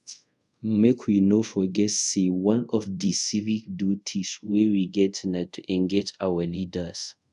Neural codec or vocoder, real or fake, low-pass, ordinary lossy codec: codec, 24 kHz, 0.5 kbps, DualCodec; fake; 10.8 kHz; none